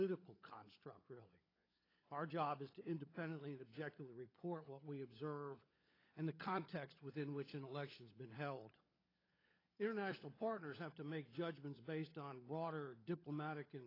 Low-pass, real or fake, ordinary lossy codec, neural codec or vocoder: 5.4 kHz; fake; AAC, 24 kbps; codec, 16 kHz, 16 kbps, FunCodec, trained on LibriTTS, 50 frames a second